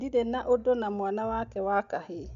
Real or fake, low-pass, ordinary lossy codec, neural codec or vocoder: real; 7.2 kHz; MP3, 64 kbps; none